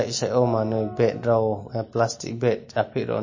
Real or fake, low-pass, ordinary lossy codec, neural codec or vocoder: real; 7.2 kHz; MP3, 32 kbps; none